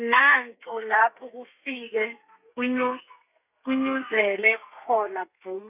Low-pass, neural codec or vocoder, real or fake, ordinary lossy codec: 3.6 kHz; codec, 32 kHz, 1.9 kbps, SNAC; fake; none